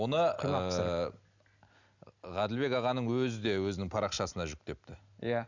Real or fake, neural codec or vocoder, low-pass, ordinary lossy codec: real; none; 7.2 kHz; none